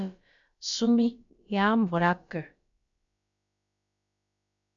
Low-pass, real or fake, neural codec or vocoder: 7.2 kHz; fake; codec, 16 kHz, about 1 kbps, DyCAST, with the encoder's durations